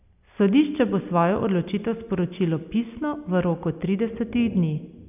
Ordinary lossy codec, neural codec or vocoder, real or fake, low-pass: none; none; real; 3.6 kHz